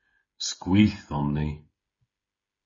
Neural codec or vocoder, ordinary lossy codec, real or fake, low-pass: codec, 16 kHz, 16 kbps, FreqCodec, smaller model; MP3, 32 kbps; fake; 7.2 kHz